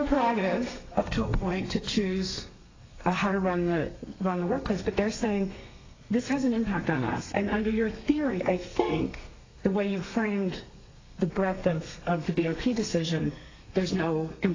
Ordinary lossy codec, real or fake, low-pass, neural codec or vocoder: AAC, 32 kbps; fake; 7.2 kHz; codec, 32 kHz, 1.9 kbps, SNAC